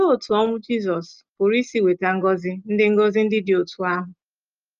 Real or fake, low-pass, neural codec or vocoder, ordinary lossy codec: real; 7.2 kHz; none; Opus, 24 kbps